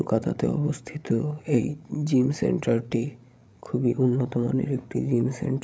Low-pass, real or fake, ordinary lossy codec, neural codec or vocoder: none; fake; none; codec, 16 kHz, 16 kbps, FreqCodec, larger model